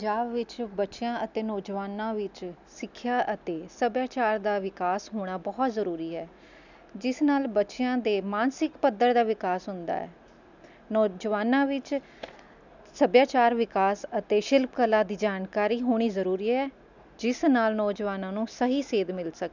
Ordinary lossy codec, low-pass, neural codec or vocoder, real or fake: none; 7.2 kHz; none; real